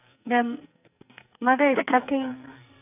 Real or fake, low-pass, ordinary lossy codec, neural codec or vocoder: fake; 3.6 kHz; none; codec, 44.1 kHz, 2.6 kbps, SNAC